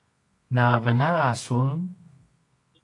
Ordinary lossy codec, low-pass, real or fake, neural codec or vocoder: AAC, 48 kbps; 10.8 kHz; fake; codec, 24 kHz, 0.9 kbps, WavTokenizer, medium music audio release